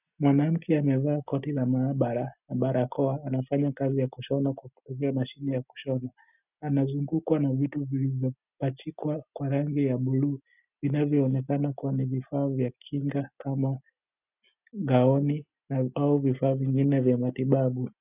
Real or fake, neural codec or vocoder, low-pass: real; none; 3.6 kHz